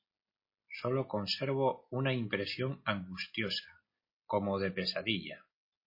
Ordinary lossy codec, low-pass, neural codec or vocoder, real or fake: MP3, 24 kbps; 5.4 kHz; none; real